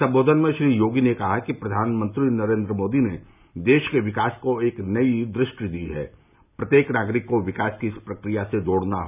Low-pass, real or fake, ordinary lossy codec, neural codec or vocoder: 3.6 kHz; real; none; none